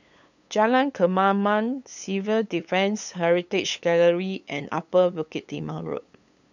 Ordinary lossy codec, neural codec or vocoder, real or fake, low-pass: none; codec, 16 kHz, 8 kbps, FunCodec, trained on LibriTTS, 25 frames a second; fake; 7.2 kHz